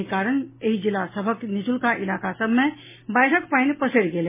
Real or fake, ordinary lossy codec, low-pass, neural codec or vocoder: real; MP3, 16 kbps; 3.6 kHz; none